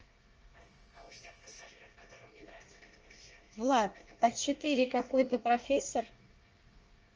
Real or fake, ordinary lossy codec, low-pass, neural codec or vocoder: fake; Opus, 24 kbps; 7.2 kHz; codec, 24 kHz, 1 kbps, SNAC